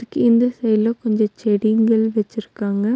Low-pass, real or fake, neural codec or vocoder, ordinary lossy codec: none; real; none; none